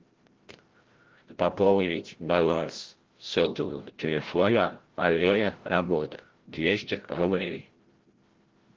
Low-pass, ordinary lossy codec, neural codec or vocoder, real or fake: 7.2 kHz; Opus, 16 kbps; codec, 16 kHz, 0.5 kbps, FreqCodec, larger model; fake